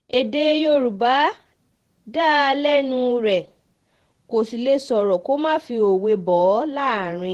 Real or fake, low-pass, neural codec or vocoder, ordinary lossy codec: fake; 14.4 kHz; vocoder, 48 kHz, 128 mel bands, Vocos; Opus, 16 kbps